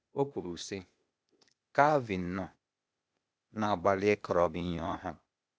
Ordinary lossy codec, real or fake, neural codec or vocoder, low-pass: none; fake; codec, 16 kHz, 0.8 kbps, ZipCodec; none